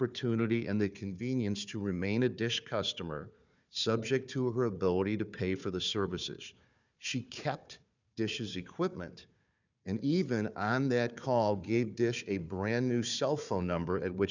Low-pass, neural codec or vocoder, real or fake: 7.2 kHz; codec, 16 kHz, 4 kbps, FunCodec, trained on Chinese and English, 50 frames a second; fake